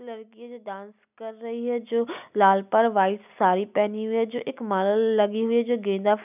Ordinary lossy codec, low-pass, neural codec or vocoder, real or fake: none; 3.6 kHz; none; real